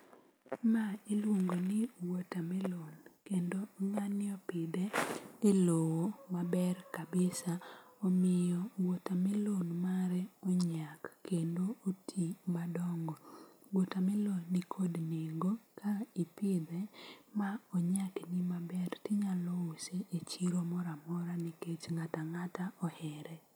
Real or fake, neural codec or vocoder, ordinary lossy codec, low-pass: real; none; none; none